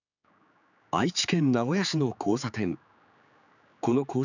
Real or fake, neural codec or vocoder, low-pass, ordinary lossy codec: fake; codec, 16 kHz, 4 kbps, X-Codec, HuBERT features, trained on general audio; 7.2 kHz; none